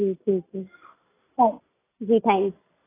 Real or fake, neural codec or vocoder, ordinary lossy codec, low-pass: real; none; AAC, 16 kbps; 3.6 kHz